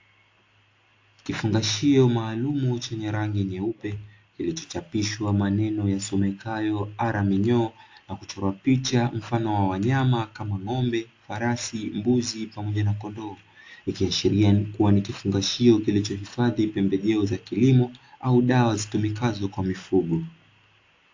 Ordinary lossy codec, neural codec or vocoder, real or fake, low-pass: AAC, 48 kbps; none; real; 7.2 kHz